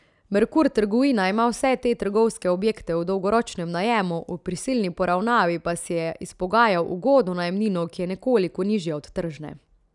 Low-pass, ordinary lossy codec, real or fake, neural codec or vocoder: 10.8 kHz; none; real; none